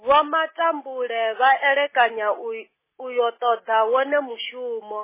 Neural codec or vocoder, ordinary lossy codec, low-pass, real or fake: none; MP3, 16 kbps; 3.6 kHz; real